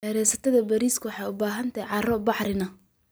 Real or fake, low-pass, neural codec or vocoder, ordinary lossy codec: real; none; none; none